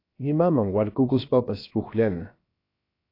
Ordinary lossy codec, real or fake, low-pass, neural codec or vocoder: AAC, 32 kbps; fake; 5.4 kHz; codec, 16 kHz, about 1 kbps, DyCAST, with the encoder's durations